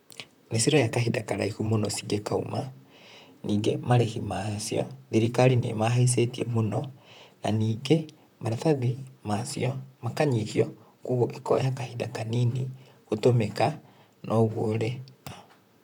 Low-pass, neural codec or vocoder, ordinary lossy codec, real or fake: 19.8 kHz; vocoder, 44.1 kHz, 128 mel bands, Pupu-Vocoder; none; fake